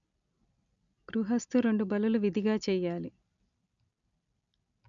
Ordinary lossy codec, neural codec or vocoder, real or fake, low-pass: none; none; real; 7.2 kHz